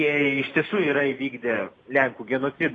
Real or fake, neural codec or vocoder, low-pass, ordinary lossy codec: real; none; 9.9 kHz; AAC, 32 kbps